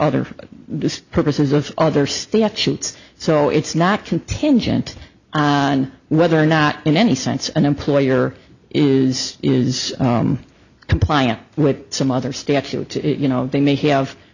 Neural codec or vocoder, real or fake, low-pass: none; real; 7.2 kHz